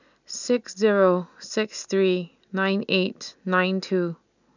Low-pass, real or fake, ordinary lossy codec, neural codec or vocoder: 7.2 kHz; real; none; none